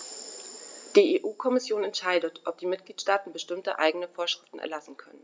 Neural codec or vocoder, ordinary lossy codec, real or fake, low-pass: none; none; real; none